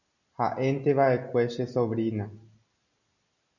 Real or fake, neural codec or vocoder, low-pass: real; none; 7.2 kHz